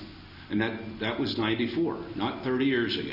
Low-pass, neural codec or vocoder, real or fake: 5.4 kHz; none; real